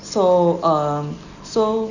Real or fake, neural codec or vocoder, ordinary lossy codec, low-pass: real; none; none; 7.2 kHz